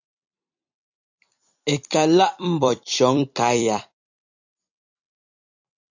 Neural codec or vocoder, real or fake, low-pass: vocoder, 44.1 kHz, 128 mel bands every 256 samples, BigVGAN v2; fake; 7.2 kHz